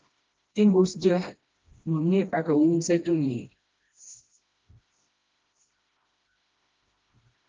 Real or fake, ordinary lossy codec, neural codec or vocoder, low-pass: fake; Opus, 24 kbps; codec, 16 kHz, 1 kbps, FreqCodec, smaller model; 7.2 kHz